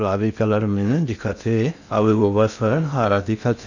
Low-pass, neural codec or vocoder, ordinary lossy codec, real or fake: 7.2 kHz; codec, 16 kHz in and 24 kHz out, 0.6 kbps, FocalCodec, streaming, 2048 codes; none; fake